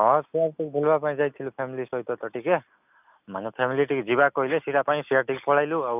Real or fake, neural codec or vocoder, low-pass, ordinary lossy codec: real; none; 3.6 kHz; none